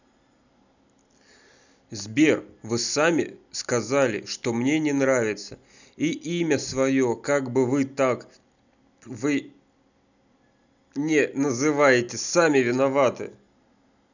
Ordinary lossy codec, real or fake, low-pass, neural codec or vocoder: none; real; 7.2 kHz; none